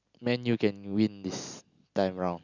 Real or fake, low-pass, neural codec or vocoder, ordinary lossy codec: real; 7.2 kHz; none; none